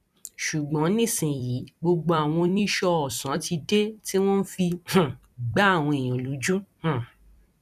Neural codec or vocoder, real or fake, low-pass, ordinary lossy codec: vocoder, 44.1 kHz, 128 mel bands every 256 samples, BigVGAN v2; fake; 14.4 kHz; none